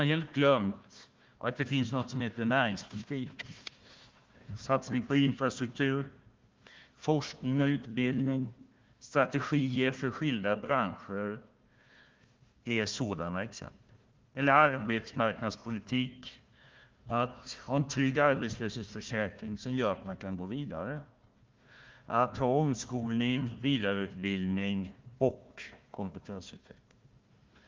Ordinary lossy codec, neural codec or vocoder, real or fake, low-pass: Opus, 32 kbps; codec, 16 kHz, 1 kbps, FunCodec, trained on Chinese and English, 50 frames a second; fake; 7.2 kHz